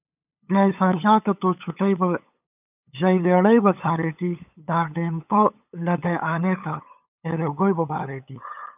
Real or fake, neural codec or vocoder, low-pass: fake; codec, 16 kHz, 8 kbps, FunCodec, trained on LibriTTS, 25 frames a second; 3.6 kHz